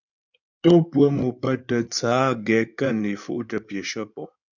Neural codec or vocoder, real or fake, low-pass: codec, 16 kHz in and 24 kHz out, 2.2 kbps, FireRedTTS-2 codec; fake; 7.2 kHz